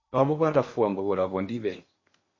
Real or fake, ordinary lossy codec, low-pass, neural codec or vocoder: fake; MP3, 32 kbps; 7.2 kHz; codec, 16 kHz in and 24 kHz out, 0.8 kbps, FocalCodec, streaming, 65536 codes